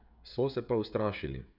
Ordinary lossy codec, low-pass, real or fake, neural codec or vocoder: none; 5.4 kHz; fake; codec, 16 kHz, 16 kbps, FreqCodec, smaller model